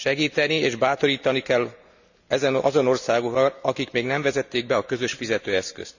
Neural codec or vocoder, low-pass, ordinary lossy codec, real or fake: none; 7.2 kHz; none; real